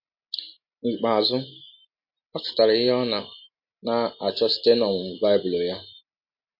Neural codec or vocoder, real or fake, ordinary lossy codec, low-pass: none; real; MP3, 32 kbps; 5.4 kHz